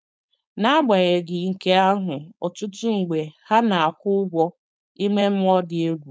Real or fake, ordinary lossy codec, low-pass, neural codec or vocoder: fake; none; none; codec, 16 kHz, 4.8 kbps, FACodec